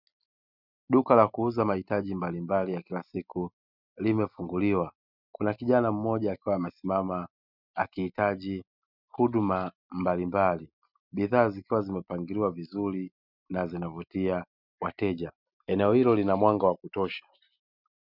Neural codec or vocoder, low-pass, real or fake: none; 5.4 kHz; real